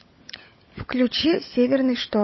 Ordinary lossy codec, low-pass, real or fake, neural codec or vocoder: MP3, 24 kbps; 7.2 kHz; fake; codec, 16 kHz, 16 kbps, FunCodec, trained on LibriTTS, 50 frames a second